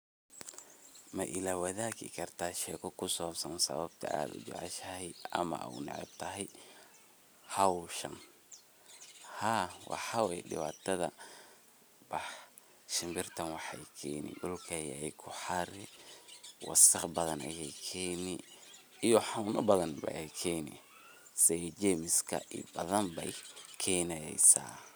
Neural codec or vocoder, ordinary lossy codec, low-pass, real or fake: none; none; none; real